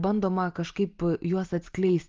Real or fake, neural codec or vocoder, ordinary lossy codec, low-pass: real; none; Opus, 32 kbps; 7.2 kHz